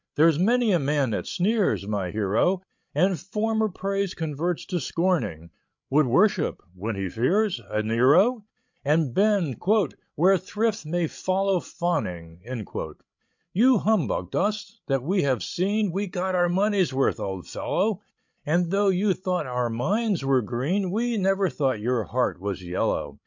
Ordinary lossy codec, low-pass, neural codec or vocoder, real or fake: MP3, 64 kbps; 7.2 kHz; codec, 16 kHz, 16 kbps, FreqCodec, larger model; fake